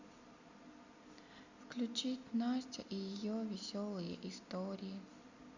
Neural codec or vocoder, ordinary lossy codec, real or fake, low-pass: none; Opus, 64 kbps; real; 7.2 kHz